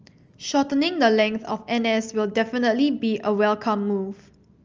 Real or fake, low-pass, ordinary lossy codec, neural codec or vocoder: real; 7.2 kHz; Opus, 24 kbps; none